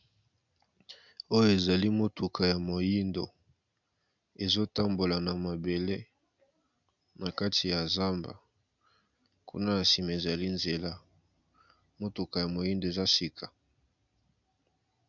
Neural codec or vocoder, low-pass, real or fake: none; 7.2 kHz; real